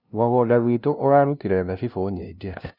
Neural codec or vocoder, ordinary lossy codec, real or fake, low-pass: codec, 16 kHz, 0.5 kbps, FunCodec, trained on LibriTTS, 25 frames a second; none; fake; 5.4 kHz